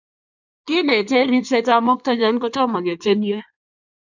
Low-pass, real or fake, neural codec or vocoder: 7.2 kHz; fake; codec, 16 kHz in and 24 kHz out, 1.1 kbps, FireRedTTS-2 codec